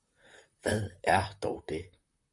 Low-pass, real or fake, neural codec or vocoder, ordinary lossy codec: 10.8 kHz; fake; vocoder, 44.1 kHz, 128 mel bands, Pupu-Vocoder; MP3, 64 kbps